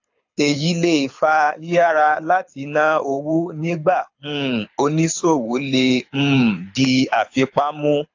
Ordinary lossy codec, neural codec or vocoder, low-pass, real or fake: AAC, 48 kbps; codec, 24 kHz, 6 kbps, HILCodec; 7.2 kHz; fake